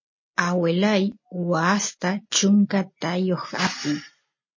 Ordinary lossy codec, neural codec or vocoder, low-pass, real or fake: MP3, 32 kbps; none; 7.2 kHz; real